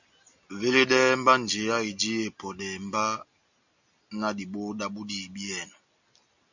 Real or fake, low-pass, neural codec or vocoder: real; 7.2 kHz; none